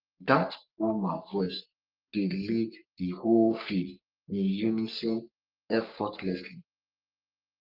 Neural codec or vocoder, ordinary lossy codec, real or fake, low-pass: codec, 44.1 kHz, 3.4 kbps, Pupu-Codec; Opus, 32 kbps; fake; 5.4 kHz